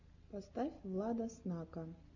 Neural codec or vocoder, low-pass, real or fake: none; 7.2 kHz; real